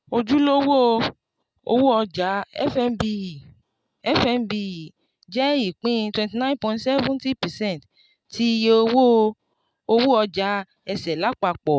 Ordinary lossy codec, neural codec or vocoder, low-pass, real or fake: none; none; none; real